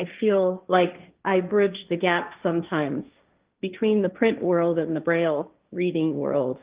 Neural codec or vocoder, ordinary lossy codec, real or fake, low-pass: codec, 16 kHz, 1.1 kbps, Voila-Tokenizer; Opus, 32 kbps; fake; 3.6 kHz